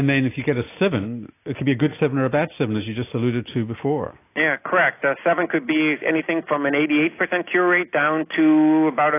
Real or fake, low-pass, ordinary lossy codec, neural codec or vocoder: real; 3.6 kHz; AAC, 24 kbps; none